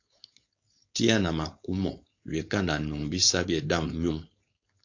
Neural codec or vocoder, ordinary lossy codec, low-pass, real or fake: codec, 16 kHz, 4.8 kbps, FACodec; AAC, 48 kbps; 7.2 kHz; fake